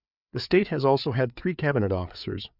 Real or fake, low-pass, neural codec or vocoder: fake; 5.4 kHz; codec, 16 kHz, 4 kbps, FreqCodec, larger model